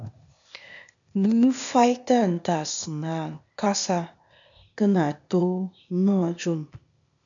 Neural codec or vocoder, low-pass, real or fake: codec, 16 kHz, 0.8 kbps, ZipCodec; 7.2 kHz; fake